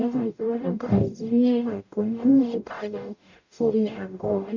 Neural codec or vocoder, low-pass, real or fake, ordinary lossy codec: codec, 44.1 kHz, 0.9 kbps, DAC; 7.2 kHz; fake; none